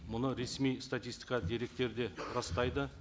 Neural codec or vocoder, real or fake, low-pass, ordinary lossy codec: none; real; none; none